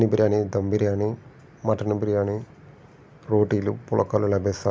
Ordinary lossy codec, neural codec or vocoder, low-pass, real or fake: none; none; none; real